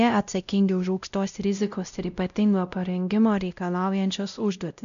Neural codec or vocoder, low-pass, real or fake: codec, 16 kHz, 0.5 kbps, X-Codec, WavLM features, trained on Multilingual LibriSpeech; 7.2 kHz; fake